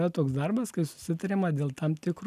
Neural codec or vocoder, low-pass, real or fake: none; 14.4 kHz; real